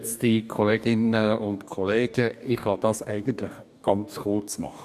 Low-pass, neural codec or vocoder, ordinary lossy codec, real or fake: 14.4 kHz; codec, 44.1 kHz, 2.6 kbps, DAC; none; fake